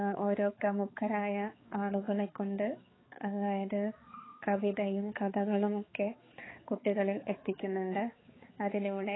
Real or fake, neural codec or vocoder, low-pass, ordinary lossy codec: fake; codec, 16 kHz, 4 kbps, X-Codec, HuBERT features, trained on balanced general audio; 7.2 kHz; AAC, 16 kbps